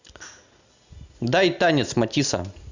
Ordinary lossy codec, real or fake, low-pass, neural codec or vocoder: Opus, 64 kbps; real; 7.2 kHz; none